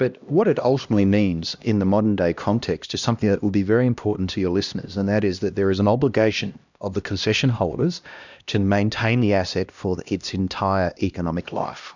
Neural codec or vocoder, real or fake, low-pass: codec, 16 kHz, 1 kbps, X-Codec, HuBERT features, trained on LibriSpeech; fake; 7.2 kHz